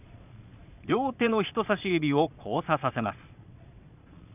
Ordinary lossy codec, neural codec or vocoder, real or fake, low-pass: none; vocoder, 22.05 kHz, 80 mel bands, Vocos; fake; 3.6 kHz